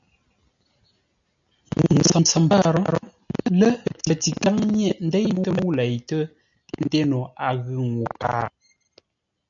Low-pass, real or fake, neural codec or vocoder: 7.2 kHz; real; none